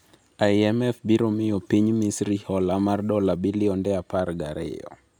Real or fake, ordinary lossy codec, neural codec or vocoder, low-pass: real; none; none; 19.8 kHz